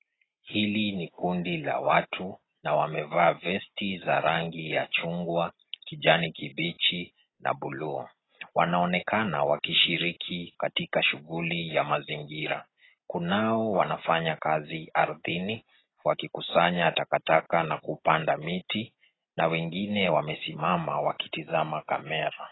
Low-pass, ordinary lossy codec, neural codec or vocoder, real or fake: 7.2 kHz; AAC, 16 kbps; none; real